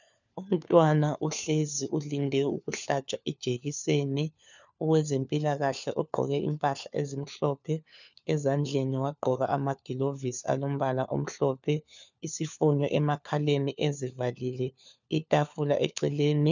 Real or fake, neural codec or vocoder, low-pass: fake; codec, 16 kHz, 4 kbps, FunCodec, trained on LibriTTS, 50 frames a second; 7.2 kHz